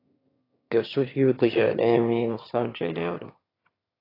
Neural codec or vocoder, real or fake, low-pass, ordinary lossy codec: autoencoder, 22.05 kHz, a latent of 192 numbers a frame, VITS, trained on one speaker; fake; 5.4 kHz; AAC, 24 kbps